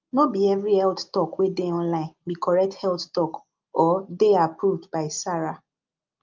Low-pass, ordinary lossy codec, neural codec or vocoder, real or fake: 7.2 kHz; Opus, 24 kbps; none; real